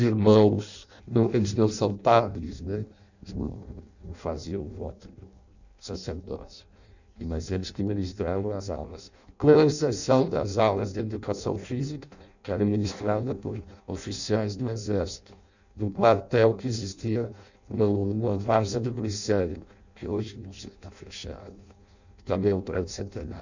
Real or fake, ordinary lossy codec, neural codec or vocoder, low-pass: fake; none; codec, 16 kHz in and 24 kHz out, 0.6 kbps, FireRedTTS-2 codec; 7.2 kHz